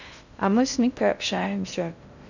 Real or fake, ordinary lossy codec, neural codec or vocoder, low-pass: fake; none; codec, 16 kHz in and 24 kHz out, 0.6 kbps, FocalCodec, streaming, 2048 codes; 7.2 kHz